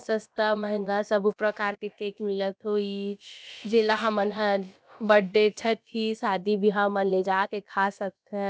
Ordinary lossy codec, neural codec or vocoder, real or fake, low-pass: none; codec, 16 kHz, about 1 kbps, DyCAST, with the encoder's durations; fake; none